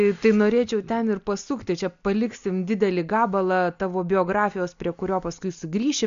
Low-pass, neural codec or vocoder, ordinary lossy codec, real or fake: 7.2 kHz; none; MP3, 64 kbps; real